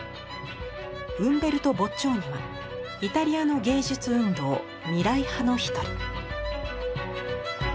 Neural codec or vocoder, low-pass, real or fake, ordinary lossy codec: none; none; real; none